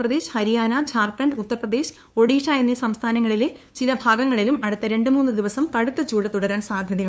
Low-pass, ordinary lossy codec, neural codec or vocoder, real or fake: none; none; codec, 16 kHz, 2 kbps, FunCodec, trained on LibriTTS, 25 frames a second; fake